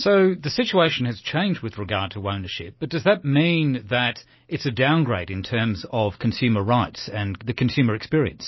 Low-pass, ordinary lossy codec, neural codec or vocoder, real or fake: 7.2 kHz; MP3, 24 kbps; none; real